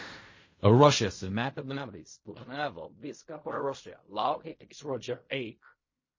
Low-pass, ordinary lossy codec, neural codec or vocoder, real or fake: 7.2 kHz; MP3, 32 kbps; codec, 16 kHz in and 24 kHz out, 0.4 kbps, LongCat-Audio-Codec, fine tuned four codebook decoder; fake